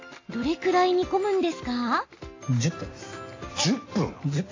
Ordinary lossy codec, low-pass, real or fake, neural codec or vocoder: AAC, 32 kbps; 7.2 kHz; real; none